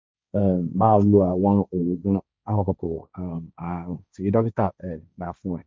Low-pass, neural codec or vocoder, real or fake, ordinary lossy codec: none; codec, 16 kHz, 1.1 kbps, Voila-Tokenizer; fake; none